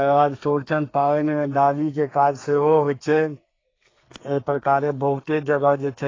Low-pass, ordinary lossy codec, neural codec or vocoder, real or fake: 7.2 kHz; AAC, 32 kbps; codec, 32 kHz, 1.9 kbps, SNAC; fake